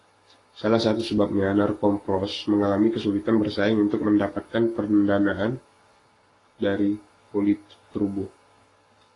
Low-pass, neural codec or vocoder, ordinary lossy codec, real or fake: 10.8 kHz; codec, 44.1 kHz, 7.8 kbps, Pupu-Codec; AAC, 32 kbps; fake